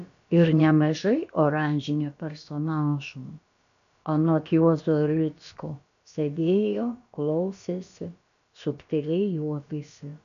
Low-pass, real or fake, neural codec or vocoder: 7.2 kHz; fake; codec, 16 kHz, about 1 kbps, DyCAST, with the encoder's durations